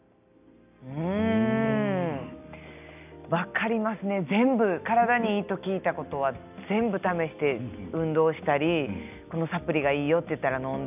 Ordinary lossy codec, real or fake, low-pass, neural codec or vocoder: none; real; 3.6 kHz; none